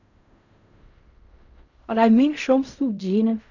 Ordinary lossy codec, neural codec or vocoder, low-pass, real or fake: none; codec, 16 kHz in and 24 kHz out, 0.4 kbps, LongCat-Audio-Codec, fine tuned four codebook decoder; 7.2 kHz; fake